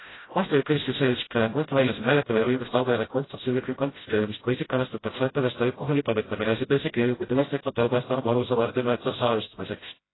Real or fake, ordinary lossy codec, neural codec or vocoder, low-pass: fake; AAC, 16 kbps; codec, 16 kHz, 0.5 kbps, FreqCodec, smaller model; 7.2 kHz